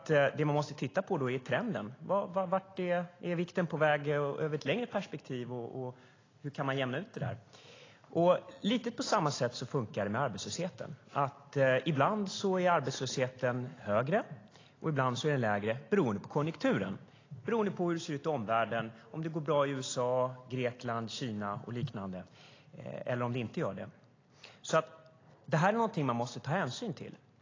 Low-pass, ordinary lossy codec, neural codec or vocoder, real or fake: 7.2 kHz; AAC, 32 kbps; none; real